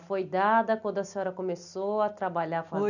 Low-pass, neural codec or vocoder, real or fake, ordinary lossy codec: 7.2 kHz; none; real; none